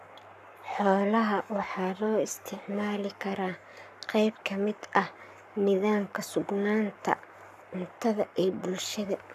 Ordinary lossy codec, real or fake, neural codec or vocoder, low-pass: none; fake; codec, 44.1 kHz, 7.8 kbps, Pupu-Codec; 14.4 kHz